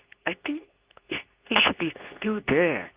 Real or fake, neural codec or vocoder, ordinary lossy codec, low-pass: fake; codec, 24 kHz, 0.9 kbps, WavTokenizer, medium speech release version 2; Opus, 32 kbps; 3.6 kHz